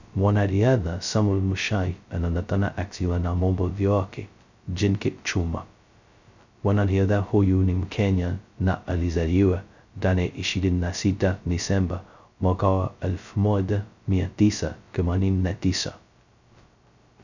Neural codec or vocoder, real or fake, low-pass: codec, 16 kHz, 0.2 kbps, FocalCodec; fake; 7.2 kHz